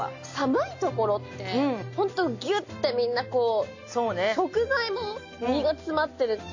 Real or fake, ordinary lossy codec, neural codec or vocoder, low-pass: real; AAC, 48 kbps; none; 7.2 kHz